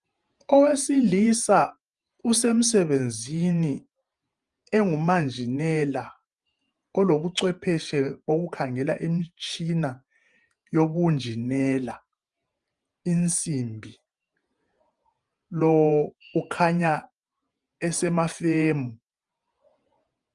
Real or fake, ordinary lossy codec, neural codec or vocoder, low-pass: fake; Opus, 32 kbps; vocoder, 48 kHz, 128 mel bands, Vocos; 10.8 kHz